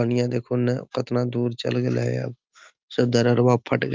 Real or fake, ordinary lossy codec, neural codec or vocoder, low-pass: real; Opus, 24 kbps; none; 7.2 kHz